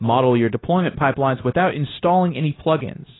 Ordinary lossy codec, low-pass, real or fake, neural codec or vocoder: AAC, 16 kbps; 7.2 kHz; fake; codec, 16 kHz, 0.9 kbps, LongCat-Audio-Codec